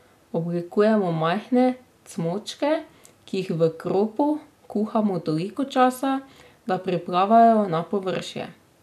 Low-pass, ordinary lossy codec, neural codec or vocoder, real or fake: 14.4 kHz; none; none; real